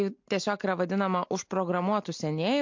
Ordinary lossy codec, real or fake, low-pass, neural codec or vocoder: MP3, 48 kbps; real; 7.2 kHz; none